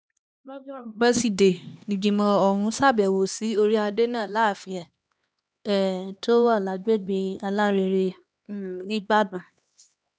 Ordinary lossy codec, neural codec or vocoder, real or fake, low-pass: none; codec, 16 kHz, 2 kbps, X-Codec, HuBERT features, trained on LibriSpeech; fake; none